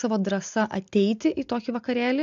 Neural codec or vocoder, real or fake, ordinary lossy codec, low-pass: none; real; AAC, 64 kbps; 7.2 kHz